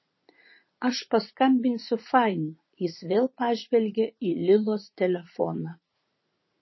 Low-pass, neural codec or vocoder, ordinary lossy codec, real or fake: 7.2 kHz; vocoder, 44.1 kHz, 80 mel bands, Vocos; MP3, 24 kbps; fake